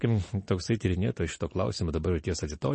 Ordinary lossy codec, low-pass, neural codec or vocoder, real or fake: MP3, 32 kbps; 9.9 kHz; none; real